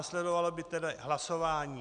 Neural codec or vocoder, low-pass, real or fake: none; 9.9 kHz; real